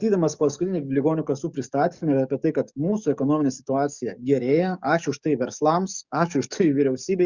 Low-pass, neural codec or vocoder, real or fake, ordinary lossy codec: 7.2 kHz; codec, 44.1 kHz, 7.8 kbps, DAC; fake; Opus, 64 kbps